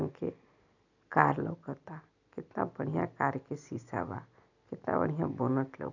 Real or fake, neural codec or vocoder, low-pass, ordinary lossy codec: real; none; 7.2 kHz; none